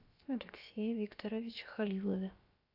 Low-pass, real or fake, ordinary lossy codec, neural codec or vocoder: 5.4 kHz; fake; AAC, 32 kbps; codec, 16 kHz, about 1 kbps, DyCAST, with the encoder's durations